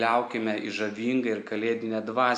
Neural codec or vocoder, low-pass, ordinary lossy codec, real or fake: none; 9.9 kHz; AAC, 64 kbps; real